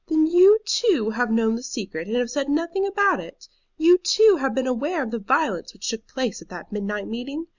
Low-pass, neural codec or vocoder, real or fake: 7.2 kHz; none; real